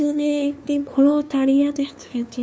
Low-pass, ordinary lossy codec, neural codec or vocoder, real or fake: none; none; codec, 16 kHz, 2 kbps, FunCodec, trained on LibriTTS, 25 frames a second; fake